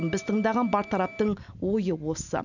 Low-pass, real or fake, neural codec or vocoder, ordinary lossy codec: 7.2 kHz; real; none; none